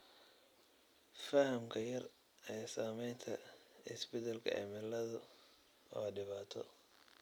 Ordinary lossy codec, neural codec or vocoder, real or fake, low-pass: none; none; real; none